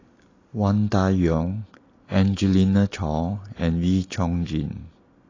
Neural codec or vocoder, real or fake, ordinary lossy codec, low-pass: none; real; AAC, 32 kbps; 7.2 kHz